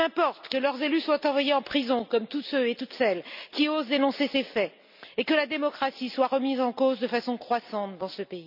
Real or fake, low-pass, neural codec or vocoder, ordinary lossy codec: real; 5.4 kHz; none; none